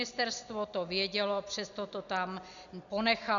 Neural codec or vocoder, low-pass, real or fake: none; 7.2 kHz; real